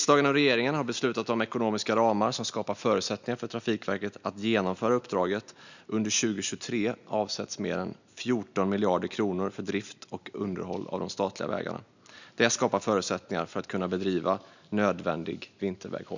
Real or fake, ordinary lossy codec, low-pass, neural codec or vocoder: real; none; 7.2 kHz; none